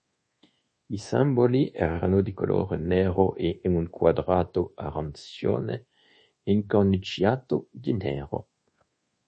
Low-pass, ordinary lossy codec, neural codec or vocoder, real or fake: 10.8 kHz; MP3, 32 kbps; codec, 24 kHz, 1.2 kbps, DualCodec; fake